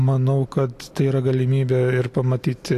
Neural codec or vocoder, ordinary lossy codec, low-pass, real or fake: none; AAC, 64 kbps; 14.4 kHz; real